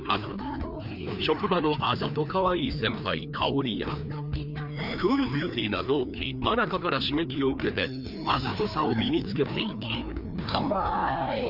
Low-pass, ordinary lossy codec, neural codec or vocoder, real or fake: 5.4 kHz; none; codec, 16 kHz, 2 kbps, FreqCodec, larger model; fake